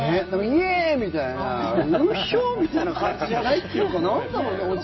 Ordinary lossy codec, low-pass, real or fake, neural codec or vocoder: MP3, 24 kbps; 7.2 kHz; real; none